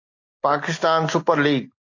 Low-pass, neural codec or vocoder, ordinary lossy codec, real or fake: 7.2 kHz; none; AAC, 48 kbps; real